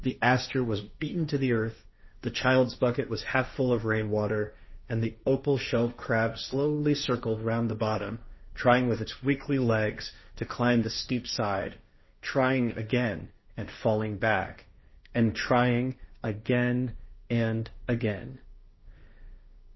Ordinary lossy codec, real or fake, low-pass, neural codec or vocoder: MP3, 24 kbps; fake; 7.2 kHz; codec, 16 kHz, 1.1 kbps, Voila-Tokenizer